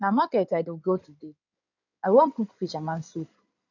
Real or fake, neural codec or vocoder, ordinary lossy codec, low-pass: fake; codec, 16 kHz in and 24 kHz out, 2.2 kbps, FireRedTTS-2 codec; none; 7.2 kHz